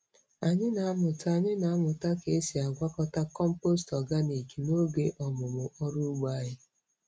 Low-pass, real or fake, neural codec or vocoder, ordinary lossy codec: none; real; none; none